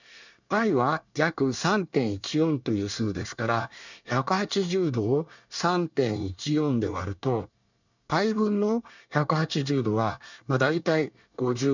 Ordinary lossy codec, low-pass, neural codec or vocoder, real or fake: none; 7.2 kHz; codec, 24 kHz, 1 kbps, SNAC; fake